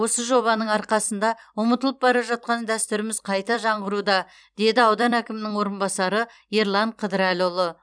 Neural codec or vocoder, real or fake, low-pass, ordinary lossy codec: vocoder, 44.1 kHz, 128 mel bands every 256 samples, BigVGAN v2; fake; 9.9 kHz; none